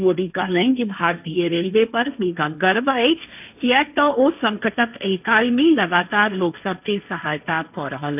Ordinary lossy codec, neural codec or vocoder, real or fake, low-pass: none; codec, 16 kHz, 1.1 kbps, Voila-Tokenizer; fake; 3.6 kHz